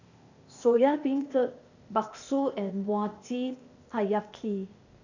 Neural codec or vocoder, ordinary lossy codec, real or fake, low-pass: codec, 16 kHz, 0.8 kbps, ZipCodec; none; fake; 7.2 kHz